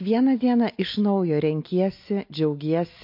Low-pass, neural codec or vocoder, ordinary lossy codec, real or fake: 5.4 kHz; none; MP3, 32 kbps; real